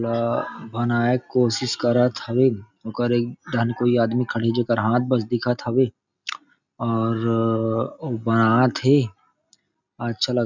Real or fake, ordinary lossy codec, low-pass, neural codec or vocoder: real; none; 7.2 kHz; none